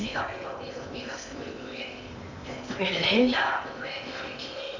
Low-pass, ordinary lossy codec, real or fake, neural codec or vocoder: 7.2 kHz; none; fake; codec, 16 kHz in and 24 kHz out, 0.8 kbps, FocalCodec, streaming, 65536 codes